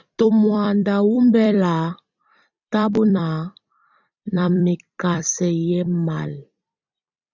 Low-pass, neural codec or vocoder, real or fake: 7.2 kHz; vocoder, 44.1 kHz, 128 mel bands every 256 samples, BigVGAN v2; fake